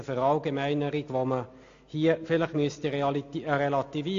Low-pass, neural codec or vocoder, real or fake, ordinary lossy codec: 7.2 kHz; none; real; AAC, 48 kbps